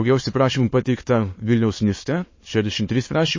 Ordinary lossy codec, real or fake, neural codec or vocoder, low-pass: MP3, 32 kbps; fake; autoencoder, 22.05 kHz, a latent of 192 numbers a frame, VITS, trained on many speakers; 7.2 kHz